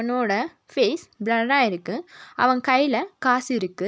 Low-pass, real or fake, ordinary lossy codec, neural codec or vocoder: none; real; none; none